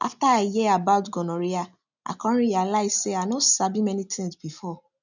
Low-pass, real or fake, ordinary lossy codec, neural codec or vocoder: 7.2 kHz; real; none; none